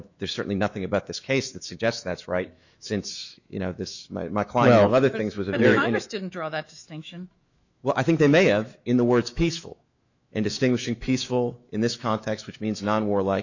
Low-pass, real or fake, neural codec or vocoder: 7.2 kHz; fake; autoencoder, 48 kHz, 128 numbers a frame, DAC-VAE, trained on Japanese speech